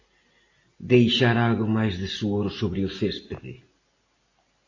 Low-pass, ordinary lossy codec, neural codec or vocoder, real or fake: 7.2 kHz; AAC, 32 kbps; none; real